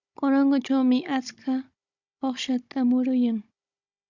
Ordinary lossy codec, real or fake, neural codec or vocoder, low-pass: Opus, 64 kbps; fake; codec, 16 kHz, 16 kbps, FunCodec, trained on Chinese and English, 50 frames a second; 7.2 kHz